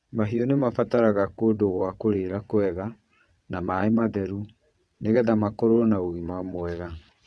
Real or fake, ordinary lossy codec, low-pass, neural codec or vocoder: fake; none; none; vocoder, 22.05 kHz, 80 mel bands, WaveNeXt